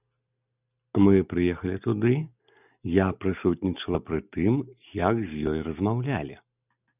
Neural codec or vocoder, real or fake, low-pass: none; real; 3.6 kHz